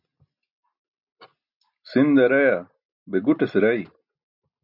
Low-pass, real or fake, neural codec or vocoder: 5.4 kHz; real; none